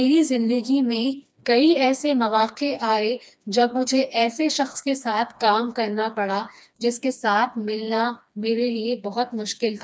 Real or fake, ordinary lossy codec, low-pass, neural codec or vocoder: fake; none; none; codec, 16 kHz, 2 kbps, FreqCodec, smaller model